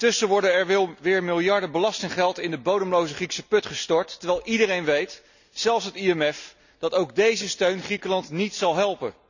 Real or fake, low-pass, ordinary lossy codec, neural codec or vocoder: real; 7.2 kHz; none; none